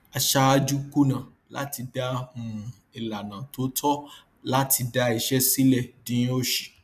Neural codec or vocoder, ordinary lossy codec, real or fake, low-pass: none; none; real; 14.4 kHz